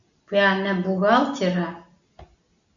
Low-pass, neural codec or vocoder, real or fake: 7.2 kHz; none; real